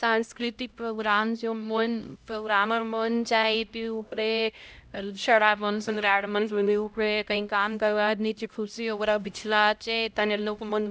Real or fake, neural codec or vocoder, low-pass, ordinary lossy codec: fake; codec, 16 kHz, 0.5 kbps, X-Codec, HuBERT features, trained on LibriSpeech; none; none